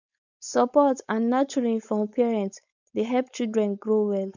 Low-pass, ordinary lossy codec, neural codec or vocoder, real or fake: 7.2 kHz; none; codec, 16 kHz, 4.8 kbps, FACodec; fake